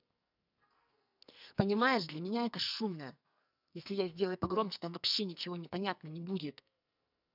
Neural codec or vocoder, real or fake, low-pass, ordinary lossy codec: codec, 44.1 kHz, 2.6 kbps, SNAC; fake; 5.4 kHz; none